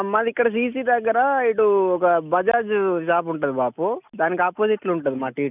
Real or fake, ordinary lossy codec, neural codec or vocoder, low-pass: real; none; none; 3.6 kHz